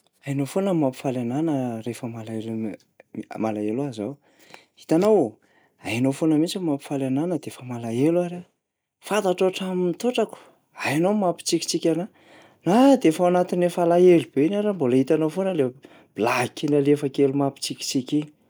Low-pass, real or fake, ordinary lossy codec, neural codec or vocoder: none; real; none; none